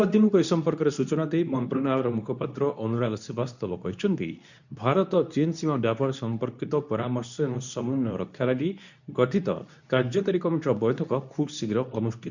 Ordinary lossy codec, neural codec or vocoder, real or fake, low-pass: none; codec, 24 kHz, 0.9 kbps, WavTokenizer, medium speech release version 2; fake; 7.2 kHz